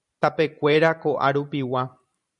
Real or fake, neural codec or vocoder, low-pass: fake; vocoder, 44.1 kHz, 128 mel bands every 512 samples, BigVGAN v2; 10.8 kHz